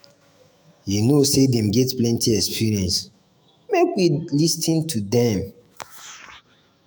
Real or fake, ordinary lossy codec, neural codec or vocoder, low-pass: fake; none; autoencoder, 48 kHz, 128 numbers a frame, DAC-VAE, trained on Japanese speech; none